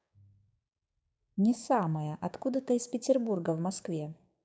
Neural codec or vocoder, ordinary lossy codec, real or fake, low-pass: codec, 16 kHz, 6 kbps, DAC; none; fake; none